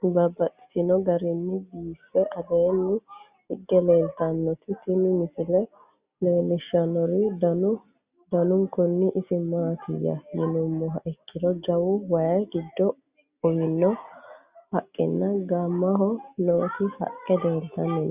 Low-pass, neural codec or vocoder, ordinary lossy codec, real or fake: 3.6 kHz; none; Opus, 64 kbps; real